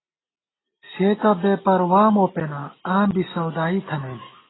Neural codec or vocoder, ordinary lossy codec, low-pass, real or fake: none; AAC, 16 kbps; 7.2 kHz; real